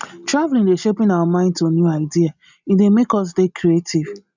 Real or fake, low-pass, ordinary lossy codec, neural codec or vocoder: real; 7.2 kHz; none; none